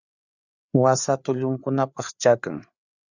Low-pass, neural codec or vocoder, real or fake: 7.2 kHz; codec, 16 kHz, 4 kbps, FreqCodec, larger model; fake